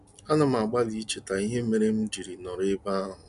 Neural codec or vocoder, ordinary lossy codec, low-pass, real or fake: none; none; 10.8 kHz; real